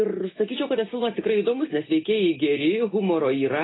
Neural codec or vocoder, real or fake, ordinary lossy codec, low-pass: none; real; AAC, 16 kbps; 7.2 kHz